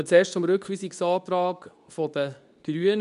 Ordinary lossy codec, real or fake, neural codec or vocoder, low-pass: none; fake; codec, 24 kHz, 0.9 kbps, WavTokenizer, medium speech release version 2; 10.8 kHz